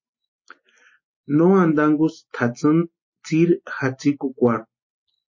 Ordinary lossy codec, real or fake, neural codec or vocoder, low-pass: MP3, 32 kbps; real; none; 7.2 kHz